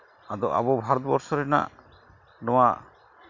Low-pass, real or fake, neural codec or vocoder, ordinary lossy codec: 7.2 kHz; real; none; none